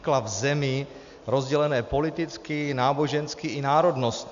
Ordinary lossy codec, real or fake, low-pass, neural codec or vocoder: MP3, 96 kbps; real; 7.2 kHz; none